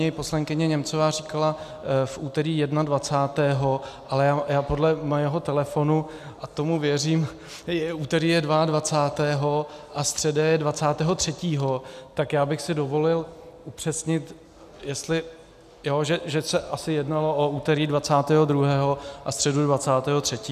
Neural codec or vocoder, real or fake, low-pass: none; real; 14.4 kHz